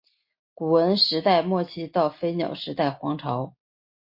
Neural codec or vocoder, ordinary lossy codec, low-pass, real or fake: none; MP3, 32 kbps; 5.4 kHz; real